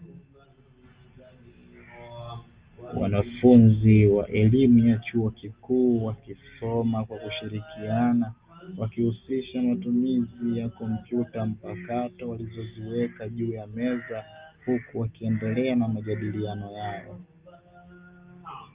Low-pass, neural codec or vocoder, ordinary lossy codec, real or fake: 3.6 kHz; none; Opus, 32 kbps; real